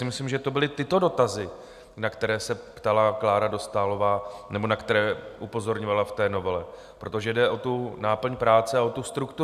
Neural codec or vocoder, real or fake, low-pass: none; real; 14.4 kHz